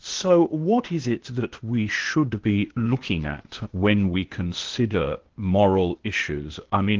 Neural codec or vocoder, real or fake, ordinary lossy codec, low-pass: codec, 16 kHz, 0.8 kbps, ZipCodec; fake; Opus, 16 kbps; 7.2 kHz